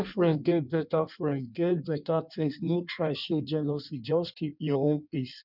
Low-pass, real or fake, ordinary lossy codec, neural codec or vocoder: 5.4 kHz; fake; none; codec, 16 kHz in and 24 kHz out, 1.1 kbps, FireRedTTS-2 codec